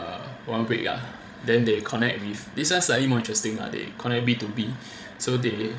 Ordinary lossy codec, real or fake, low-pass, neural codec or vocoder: none; fake; none; codec, 16 kHz, 16 kbps, FreqCodec, larger model